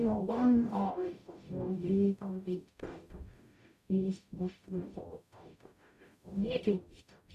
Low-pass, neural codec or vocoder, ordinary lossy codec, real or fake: 14.4 kHz; codec, 44.1 kHz, 0.9 kbps, DAC; none; fake